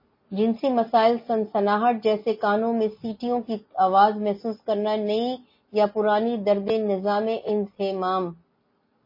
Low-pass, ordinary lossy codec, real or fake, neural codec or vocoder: 5.4 kHz; MP3, 24 kbps; real; none